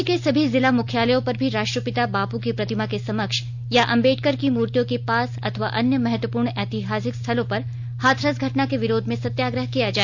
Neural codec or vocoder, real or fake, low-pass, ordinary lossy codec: none; real; 7.2 kHz; none